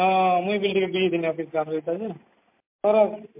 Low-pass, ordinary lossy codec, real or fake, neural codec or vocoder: 3.6 kHz; MP3, 32 kbps; real; none